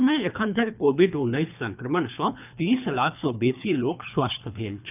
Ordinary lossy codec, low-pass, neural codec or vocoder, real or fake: none; 3.6 kHz; codec, 24 kHz, 3 kbps, HILCodec; fake